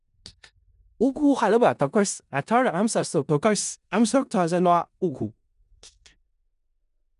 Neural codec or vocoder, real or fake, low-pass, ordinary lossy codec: codec, 16 kHz in and 24 kHz out, 0.4 kbps, LongCat-Audio-Codec, four codebook decoder; fake; 10.8 kHz; none